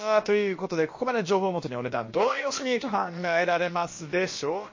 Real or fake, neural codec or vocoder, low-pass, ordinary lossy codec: fake; codec, 16 kHz, about 1 kbps, DyCAST, with the encoder's durations; 7.2 kHz; MP3, 32 kbps